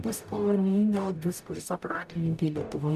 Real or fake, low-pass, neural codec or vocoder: fake; 14.4 kHz; codec, 44.1 kHz, 0.9 kbps, DAC